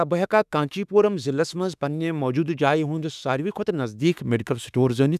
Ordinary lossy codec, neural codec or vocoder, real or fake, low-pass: none; autoencoder, 48 kHz, 32 numbers a frame, DAC-VAE, trained on Japanese speech; fake; 14.4 kHz